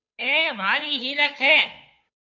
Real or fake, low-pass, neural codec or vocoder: fake; 7.2 kHz; codec, 16 kHz, 2 kbps, FunCodec, trained on Chinese and English, 25 frames a second